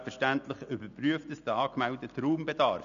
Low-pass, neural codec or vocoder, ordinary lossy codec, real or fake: 7.2 kHz; none; none; real